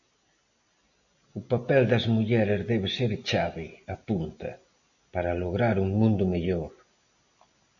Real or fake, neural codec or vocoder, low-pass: real; none; 7.2 kHz